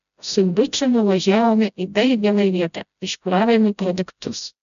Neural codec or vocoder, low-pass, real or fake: codec, 16 kHz, 0.5 kbps, FreqCodec, smaller model; 7.2 kHz; fake